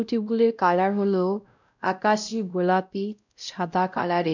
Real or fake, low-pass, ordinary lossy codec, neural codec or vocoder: fake; 7.2 kHz; none; codec, 16 kHz, 0.5 kbps, X-Codec, WavLM features, trained on Multilingual LibriSpeech